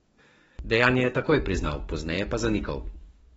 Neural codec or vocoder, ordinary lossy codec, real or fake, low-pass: codec, 44.1 kHz, 7.8 kbps, DAC; AAC, 24 kbps; fake; 19.8 kHz